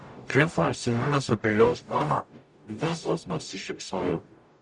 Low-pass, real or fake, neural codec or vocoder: 10.8 kHz; fake; codec, 44.1 kHz, 0.9 kbps, DAC